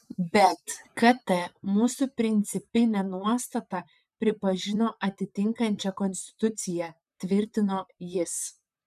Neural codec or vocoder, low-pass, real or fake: vocoder, 44.1 kHz, 128 mel bands, Pupu-Vocoder; 14.4 kHz; fake